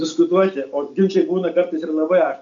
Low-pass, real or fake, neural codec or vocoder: 7.2 kHz; real; none